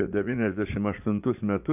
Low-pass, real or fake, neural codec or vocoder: 3.6 kHz; fake; vocoder, 44.1 kHz, 80 mel bands, Vocos